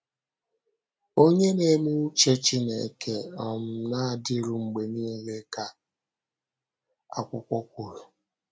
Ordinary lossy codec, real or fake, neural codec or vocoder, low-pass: none; real; none; none